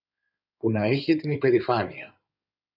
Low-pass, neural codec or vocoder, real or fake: 5.4 kHz; codec, 16 kHz in and 24 kHz out, 2.2 kbps, FireRedTTS-2 codec; fake